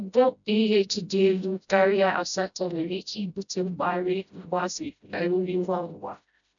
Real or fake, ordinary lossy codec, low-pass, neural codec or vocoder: fake; none; 7.2 kHz; codec, 16 kHz, 0.5 kbps, FreqCodec, smaller model